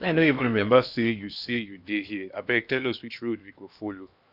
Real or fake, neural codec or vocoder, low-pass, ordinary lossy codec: fake; codec, 16 kHz in and 24 kHz out, 0.8 kbps, FocalCodec, streaming, 65536 codes; 5.4 kHz; none